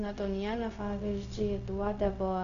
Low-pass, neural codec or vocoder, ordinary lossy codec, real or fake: 7.2 kHz; codec, 16 kHz, 0.4 kbps, LongCat-Audio-Codec; AAC, 96 kbps; fake